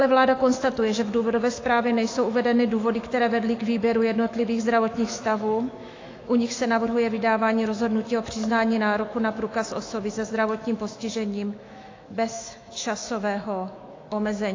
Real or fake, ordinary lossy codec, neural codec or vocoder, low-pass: fake; AAC, 32 kbps; autoencoder, 48 kHz, 128 numbers a frame, DAC-VAE, trained on Japanese speech; 7.2 kHz